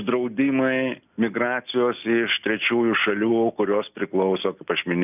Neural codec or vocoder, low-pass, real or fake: none; 3.6 kHz; real